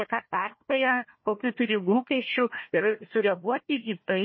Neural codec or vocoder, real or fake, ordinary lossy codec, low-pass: codec, 16 kHz, 1 kbps, FunCodec, trained on Chinese and English, 50 frames a second; fake; MP3, 24 kbps; 7.2 kHz